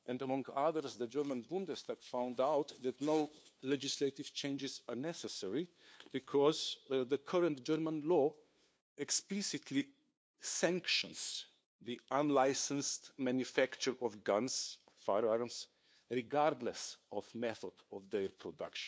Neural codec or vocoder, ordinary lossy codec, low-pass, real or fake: codec, 16 kHz, 2 kbps, FunCodec, trained on LibriTTS, 25 frames a second; none; none; fake